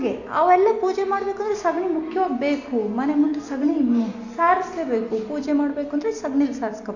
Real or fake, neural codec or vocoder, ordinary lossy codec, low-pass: real; none; none; 7.2 kHz